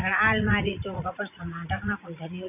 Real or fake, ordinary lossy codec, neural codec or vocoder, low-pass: fake; none; vocoder, 44.1 kHz, 80 mel bands, Vocos; 3.6 kHz